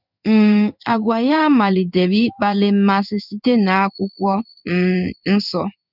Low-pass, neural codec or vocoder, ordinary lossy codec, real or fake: 5.4 kHz; codec, 16 kHz in and 24 kHz out, 1 kbps, XY-Tokenizer; none; fake